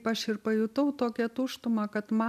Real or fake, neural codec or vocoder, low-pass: real; none; 14.4 kHz